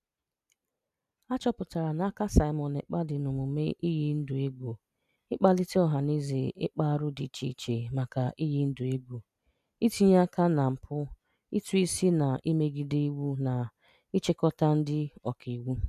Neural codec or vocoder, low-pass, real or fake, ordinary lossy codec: none; 14.4 kHz; real; none